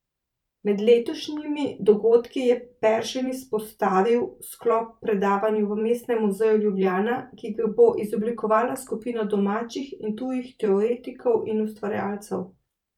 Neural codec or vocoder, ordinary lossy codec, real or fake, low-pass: vocoder, 44.1 kHz, 128 mel bands every 512 samples, BigVGAN v2; none; fake; 19.8 kHz